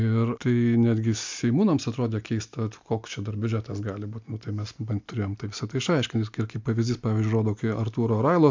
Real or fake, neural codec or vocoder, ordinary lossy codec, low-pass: real; none; MP3, 64 kbps; 7.2 kHz